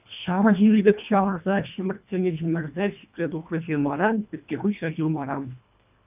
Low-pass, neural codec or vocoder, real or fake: 3.6 kHz; codec, 24 kHz, 1.5 kbps, HILCodec; fake